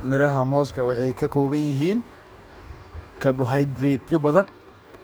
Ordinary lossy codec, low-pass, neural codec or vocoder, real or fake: none; none; codec, 44.1 kHz, 2.6 kbps, DAC; fake